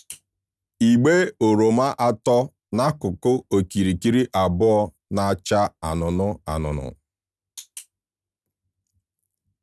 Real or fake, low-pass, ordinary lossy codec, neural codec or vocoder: real; none; none; none